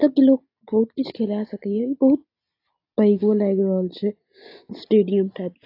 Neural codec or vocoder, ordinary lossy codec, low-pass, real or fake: none; AAC, 32 kbps; 5.4 kHz; real